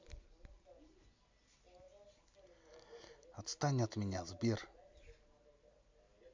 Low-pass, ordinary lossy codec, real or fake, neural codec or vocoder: 7.2 kHz; MP3, 64 kbps; real; none